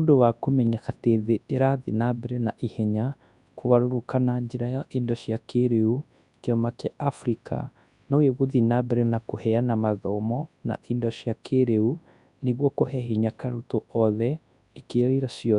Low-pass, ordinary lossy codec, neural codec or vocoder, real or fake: 10.8 kHz; none; codec, 24 kHz, 0.9 kbps, WavTokenizer, large speech release; fake